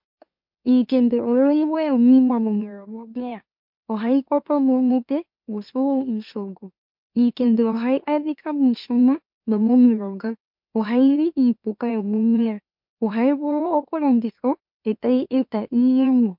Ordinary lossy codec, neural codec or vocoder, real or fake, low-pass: MP3, 48 kbps; autoencoder, 44.1 kHz, a latent of 192 numbers a frame, MeloTTS; fake; 5.4 kHz